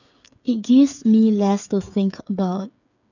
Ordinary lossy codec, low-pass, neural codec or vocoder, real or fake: none; 7.2 kHz; codec, 16 kHz, 8 kbps, FreqCodec, smaller model; fake